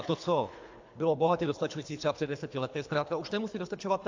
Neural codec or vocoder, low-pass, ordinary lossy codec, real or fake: codec, 24 kHz, 3 kbps, HILCodec; 7.2 kHz; AAC, 48 kbps; fake